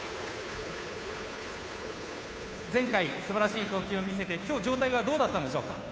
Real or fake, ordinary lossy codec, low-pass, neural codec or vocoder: fake; none; none; codec, 16 kHz, 2 kbps, FunCodec, trained on Chinese and English, 25 frames a second